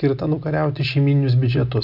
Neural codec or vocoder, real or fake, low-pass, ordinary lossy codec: none; real; 5.4 kHz; MP3, 48 kbps